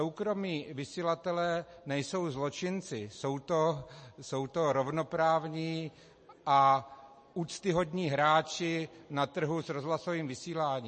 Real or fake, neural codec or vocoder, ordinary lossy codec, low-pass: real; none; MP3, 32 kbps; 10.8 kHz